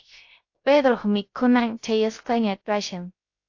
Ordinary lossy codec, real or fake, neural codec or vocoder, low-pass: AAC, 48 kbps; fake; codec, 16 kHz, 0.3 kbps, FocalCodec; 7.2 kHz